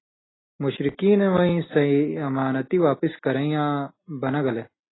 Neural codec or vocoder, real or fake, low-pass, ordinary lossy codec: none; real; 7.2 kHz; AAC, 16 kbps